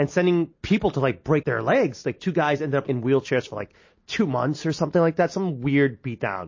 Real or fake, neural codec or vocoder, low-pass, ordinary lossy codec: real; none; 7.2 kHz; MP3, 32 kbps